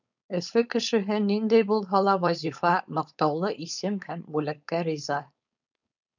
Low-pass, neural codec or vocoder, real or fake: 7.2 kHz; codec, 16 kHz, 4.8 kbps, FACodec; fake